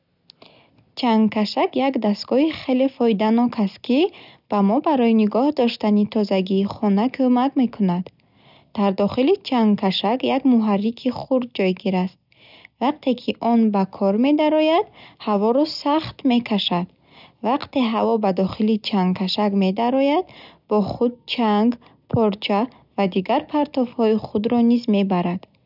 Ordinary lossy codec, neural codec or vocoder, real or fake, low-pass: none; none; real; 5.4 kHz